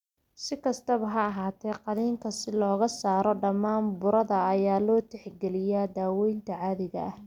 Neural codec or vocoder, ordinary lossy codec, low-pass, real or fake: none; none; 19.8 kHz; real